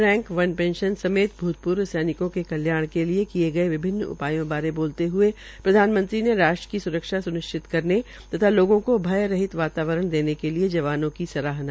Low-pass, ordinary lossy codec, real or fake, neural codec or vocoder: none; none; real; none